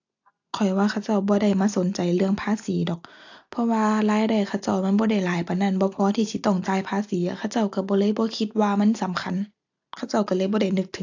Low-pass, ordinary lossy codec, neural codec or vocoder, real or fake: 7.2 kHz; AAC, 48 kbps; none; real